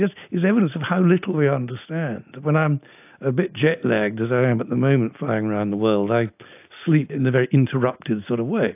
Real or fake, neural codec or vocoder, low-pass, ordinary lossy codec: real; none; 3.6 kHz; AAC, 32 kbps